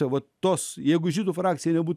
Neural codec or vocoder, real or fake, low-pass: none; real; 14.4 kHz